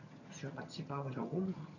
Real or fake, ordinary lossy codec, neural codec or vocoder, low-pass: fake; none; vocoder, 22.05 kHz, 80 mel bands, HiFi-GAN; 7.2 kHz